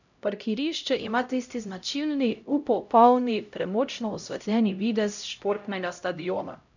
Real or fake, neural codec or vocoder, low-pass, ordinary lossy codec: fake; codec, 16 kHz, 0.5 kbps, X-Codec, HuBERT features, trained on LibriSpeech; 7.2 kHz; none